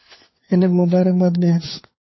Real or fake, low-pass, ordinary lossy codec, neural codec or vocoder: fake; 7.2 kHz; MP3, 24 kbps; codec, 16 kHz, 4 kbps, FunCodec, trained on LibriTTS, 50 frames a second